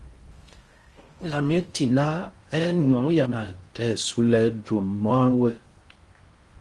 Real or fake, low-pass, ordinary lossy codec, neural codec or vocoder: fake; 10.8 kHz; Opus, 24 kbps; codec, 16 kHz in and 24 kHz out, 0.6 kbps, FocalCodec, streaming, 4096 codes